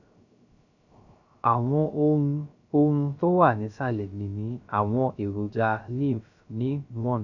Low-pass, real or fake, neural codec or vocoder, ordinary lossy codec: 7.2 kHz; fake; codec, 16 kHz, 0.3 kbps, FocalCodec; none